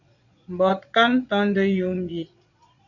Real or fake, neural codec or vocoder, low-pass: fake; vocoder, 22.05 kHz, 80 mel bands, Vocos; 7.2 kHz